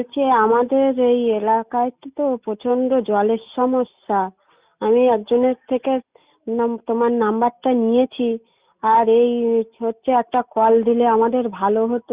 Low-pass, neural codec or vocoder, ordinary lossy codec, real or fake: 3.6 kHz; none; Opus, 64 kbps; real